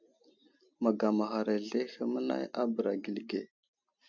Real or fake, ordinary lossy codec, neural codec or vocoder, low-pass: real; MP3, 64 kbps; none; 7.2 kHz